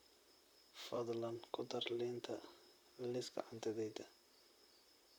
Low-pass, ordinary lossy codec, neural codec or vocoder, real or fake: none; none; vocoder, 44.1 kHz, 128 mel bands, Pupu-Vocoder; fake